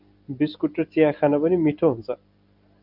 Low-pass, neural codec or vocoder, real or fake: 5.4 kHz; none; real